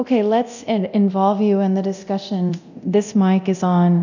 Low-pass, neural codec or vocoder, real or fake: 7.2 kHz; codec, 24 kHz, 0.9 kbps, DualCodec; fake